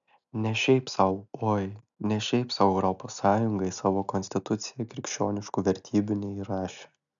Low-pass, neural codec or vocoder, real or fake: 7.2 kHz; none; real